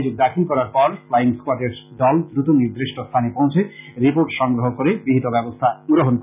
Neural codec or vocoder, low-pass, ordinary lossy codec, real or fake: none; 3.6 kHz; none; real